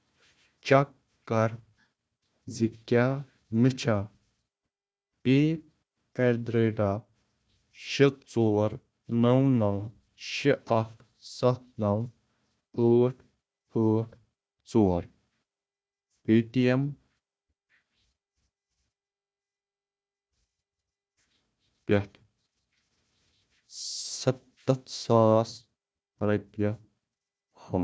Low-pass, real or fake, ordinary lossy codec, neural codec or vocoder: none; fake; none; codec, 16 kHz, 1 kbps, FunCodec, trained on Chinese and English, 50 frames a second